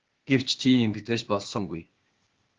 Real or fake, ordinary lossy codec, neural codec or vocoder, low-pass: fake; Opus, 16 kbps; codec, 16 kHz, 0.8 kbps, ZipCodec; 7.2 kHz